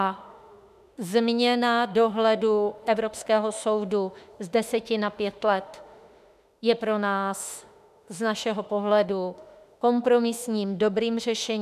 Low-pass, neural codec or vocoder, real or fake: 14.4 kHz; autoencoder, 48 kHz, 32 numbers a frame, DAC-VAE, trained on Japanese speech; fake